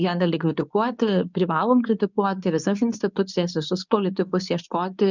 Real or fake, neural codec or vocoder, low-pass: fake; codec, 24 kHz, 0.9 kbps, WavTokenizer, medium speech release version 2; 7.2 kHz